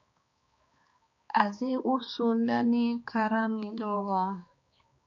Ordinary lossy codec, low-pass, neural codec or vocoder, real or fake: MP3, 48 kbps; 7.2 kHz; codec, 16 kHz, 2 kbps, X-Codec, HuBERT features, trained on balanced general audio; fake